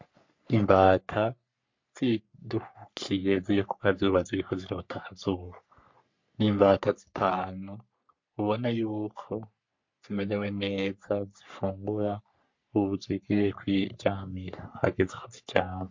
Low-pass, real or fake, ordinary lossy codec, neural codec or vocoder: 7.2 kHz; fake; MP3, 48 kbps; codec, 44.1 kHz, 3.4 kbps, Pupu-Codec